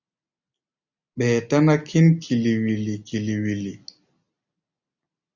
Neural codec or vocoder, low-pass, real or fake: none; 7.2 kHz; real